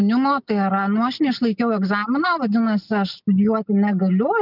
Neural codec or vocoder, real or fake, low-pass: none; real; 5.4 kHz